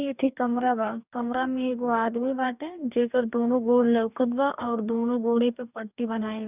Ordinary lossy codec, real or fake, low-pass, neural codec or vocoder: none; fake; 3.6 kHz; codec, 44.1 kHz, 2.6 kbps, DAC